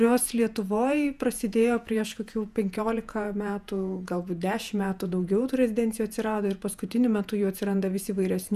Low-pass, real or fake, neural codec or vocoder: 14.4 kHz; fake; vocoder, 48 kHz, 128 mel bands, Vocos